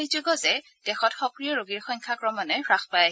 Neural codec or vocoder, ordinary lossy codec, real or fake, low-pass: none; none; real; none